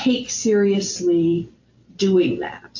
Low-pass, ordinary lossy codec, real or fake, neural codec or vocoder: 7.2 kHz; AAC, 48 kbps; real; none